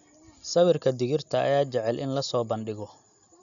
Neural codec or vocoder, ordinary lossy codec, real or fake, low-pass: none; none; real; 7.2 kHz